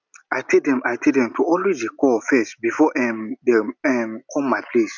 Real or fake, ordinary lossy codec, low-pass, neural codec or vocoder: real; none; 7.2 kHz; none